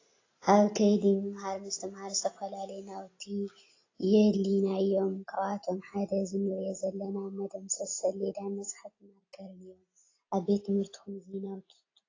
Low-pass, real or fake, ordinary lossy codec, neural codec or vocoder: 7.2 kHz; real; AAC, 32 kbps; none